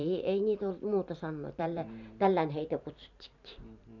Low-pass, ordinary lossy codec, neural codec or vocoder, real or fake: 7.2 kHz; none; vocoder, 44.1 kHz, 128 mel bands every 512 samples, BigVGAN v2; fake